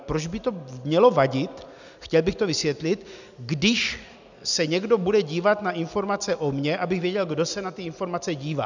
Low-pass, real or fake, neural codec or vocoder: 7.2 kHz; real; none